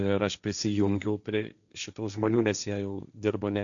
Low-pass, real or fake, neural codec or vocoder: 7.2 kHz; fake; codec, 16 kHz, 1.1 kbps, Voila-Tokenizer